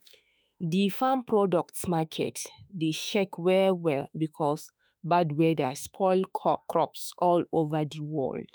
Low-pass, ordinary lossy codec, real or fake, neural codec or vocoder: none; none; fake; autoencoder, 48 kHz, 32 numbers a frame, DAC-VAE, trained on Japanese speech